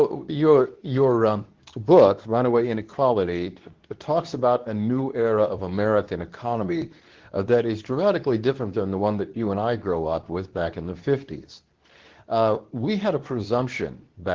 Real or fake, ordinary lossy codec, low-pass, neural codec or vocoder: fake; Opus, 16 kbps; 7.2 kHz; codec, 24 kHz, 0.9 kbps, WavTokenizer, medium speech release version 2